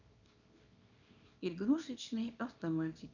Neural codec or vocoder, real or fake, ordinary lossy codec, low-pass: codec, 24 kHz, 0.9 kbps, WavTokenizer, small release; fake; none; 7.2 kHz